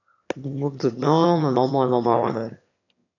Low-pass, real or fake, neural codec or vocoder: 7.2 kHz; fake; autoencoder, 22.05 kHz, a latent of 192 numbers a frame, VITS, trained on one speaker